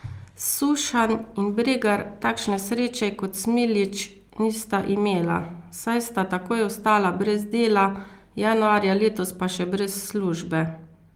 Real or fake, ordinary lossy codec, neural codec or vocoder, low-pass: real; Opus, 24 kbps; none; 19.8 kHz